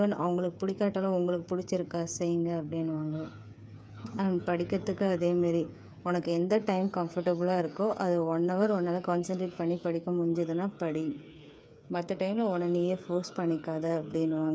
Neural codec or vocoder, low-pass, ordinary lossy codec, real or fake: codec, 16 kHz, 8 kbps, FreqCodec, smaller model; none; none; fake